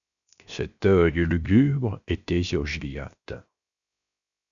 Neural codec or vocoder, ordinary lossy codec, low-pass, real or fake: codec, 16 kHz, 0.7 kbps, FocalCodec; MP3, 96 kbps; 7.2 kHz; fake